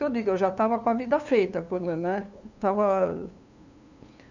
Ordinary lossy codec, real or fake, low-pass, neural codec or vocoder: none; fake; 7.2 kHz; codec, 16 kHz, 2 kbps, FunCodec, trained on LibriTTS, 25 frames a second